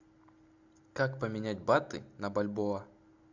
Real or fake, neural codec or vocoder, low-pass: real; none; 7.2 kHz